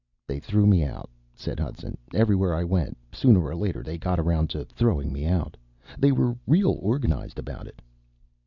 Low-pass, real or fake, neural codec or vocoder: 7.2 kHz; real; none